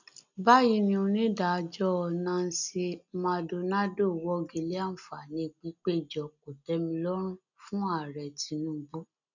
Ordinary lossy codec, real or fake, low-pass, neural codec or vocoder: none; real; 7.2 kHz; none